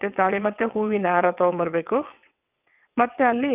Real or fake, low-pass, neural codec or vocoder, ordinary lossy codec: fake; 3.6 kHz; vocoder, 22.05 kHz, 80 mel bands, WaveNeXt; none